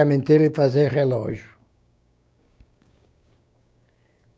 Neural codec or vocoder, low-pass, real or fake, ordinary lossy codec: codec, 16 kHz, 6 kbps, DAC; none; fake; none